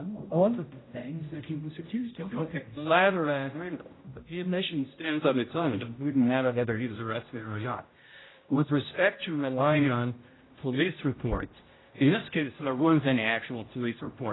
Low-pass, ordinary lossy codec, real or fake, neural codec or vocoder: 7.2 kHz; AAC, 16 kbps; fake; codec, 16 kHz, 0.5 kbps, X-Codec, HuBERT features, trained on general audio